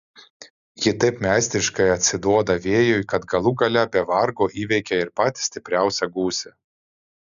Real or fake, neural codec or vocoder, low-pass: real; none; 7.2 kHz